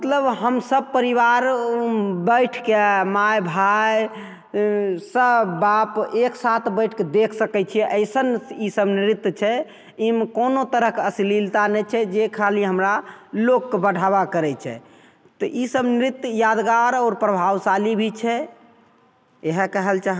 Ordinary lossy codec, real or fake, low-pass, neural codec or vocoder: none; real; none; none